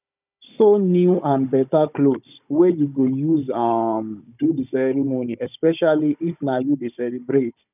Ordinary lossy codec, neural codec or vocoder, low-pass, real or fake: none; codec, 16 kHz, 16 kbps, FunCodec, trained on Chinese and English, 50 frames a second; 3.6 kHz; fake